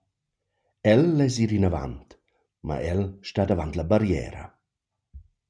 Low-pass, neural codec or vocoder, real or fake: 9.9 kHz; none; real